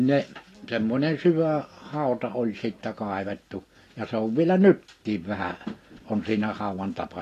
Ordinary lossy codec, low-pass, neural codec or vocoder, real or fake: AAC, 48 kbps; 14.4 kHz; none; real